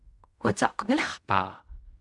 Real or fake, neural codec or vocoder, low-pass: fake; codec, 16 kHz in and 24 kHz out, 0.4 kbps, LongCat-Audio-Codec, fine tuned four codebook decoder; 10.8 kHz